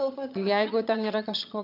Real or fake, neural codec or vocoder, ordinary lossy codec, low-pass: fake; vocoder, 22.05 kHz, 80 mel bands, HiFi-GAN; AAC, 48 kbps; 5.4 kHz